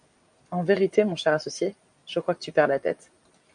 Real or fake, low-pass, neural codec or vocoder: real; 9.9 kHz; none